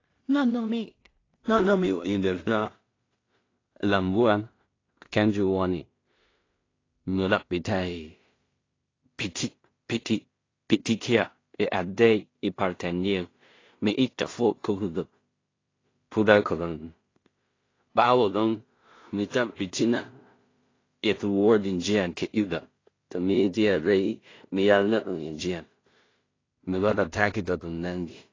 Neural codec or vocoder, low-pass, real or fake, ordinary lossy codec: codec, 16 kHz in and 24 kHz out, 0.4 kbps, LongCat-Audio-Codec, two codebook decoder; 7.2 kHz; fake; AAC, 32 kbps